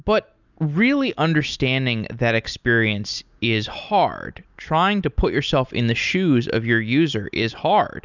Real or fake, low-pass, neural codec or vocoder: real; 7.2 kHz; none